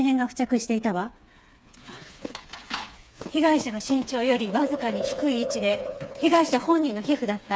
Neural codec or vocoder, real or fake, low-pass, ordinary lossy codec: codec, 16 kHz, 4 kbps, FreqCodec, smaller model; fake; none; none